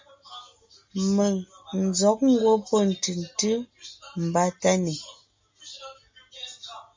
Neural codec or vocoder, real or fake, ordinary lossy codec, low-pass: none; real; MP3, 64 kbps; 7.2 kHz